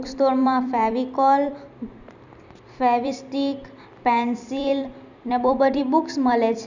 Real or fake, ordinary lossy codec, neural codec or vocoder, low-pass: fake; none; vocoder, 44.1 kHz, 128 mel bands every 256 samples, BigVGAN v2; 7.2 kHz